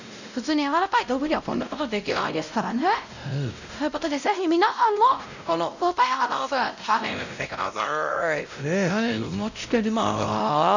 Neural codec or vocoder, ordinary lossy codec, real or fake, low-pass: codec, 16 kHz, 0.5 kbps, X-Codec, WavLM features, trained on Multilingual LibriSpeech; none; fake; 7.2 kHz